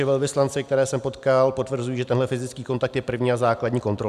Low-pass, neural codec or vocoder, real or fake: 14.4 kHz; none; real